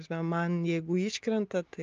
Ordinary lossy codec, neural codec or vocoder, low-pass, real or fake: Opus, 24 kbps; none; 7.2 kHz; real